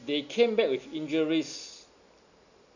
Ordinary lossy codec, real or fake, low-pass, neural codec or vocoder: none; real; 7.2 kHz; none